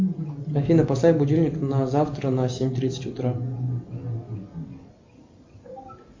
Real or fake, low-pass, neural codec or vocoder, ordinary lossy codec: real; 7.2 kHz; none; MP3, 64 kbps